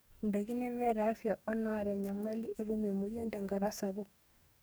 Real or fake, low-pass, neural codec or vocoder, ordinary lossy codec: fake; none; codec, 44.1 kHz, 2.6 kbps, DAC; none